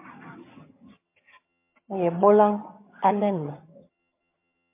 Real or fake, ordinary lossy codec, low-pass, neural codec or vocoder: fake; MP3, 24 kbps; 3.6 kHz; vocoder, 22.05 kHz, 80 mel bands, HiFi-GAN